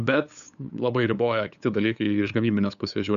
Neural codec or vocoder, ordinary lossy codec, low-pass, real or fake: codec, 16 kHz, 8 kbps, FunCodec, trained on LibriTTS, 25 frames a second; MP3, 96 kbps; 7.2 kHz; fake